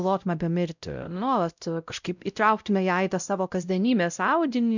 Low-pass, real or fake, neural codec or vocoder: 7.2 kHz; fake; codec, 16 kHz, 0.5 kbps, X-Codec, WavLM features, trained on Multilingual LibriSpeech